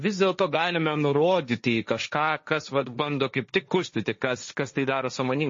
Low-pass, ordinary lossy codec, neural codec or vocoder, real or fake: 7.2 kHz; MP3, 32 kbps; codec, 16 kHz, 1.1 kbps, Voila-Tokenizer; fake